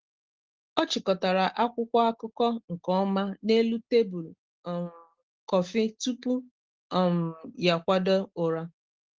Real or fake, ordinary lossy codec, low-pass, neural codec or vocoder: real; Opus, 16 kbps; 7.2 kHz; none